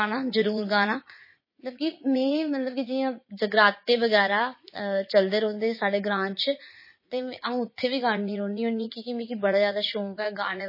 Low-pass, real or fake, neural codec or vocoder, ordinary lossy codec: 5.4 kHz; fake; vocoder, 44.1 kHz, 80 mel bands, Vocos; MP3, 24 kbps